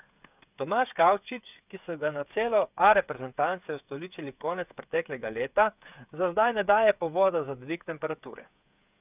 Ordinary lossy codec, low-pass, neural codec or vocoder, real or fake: none; 3.6 kHz; codec, 16 kHz, 8 kbps, FreqCodec, smaller model; fake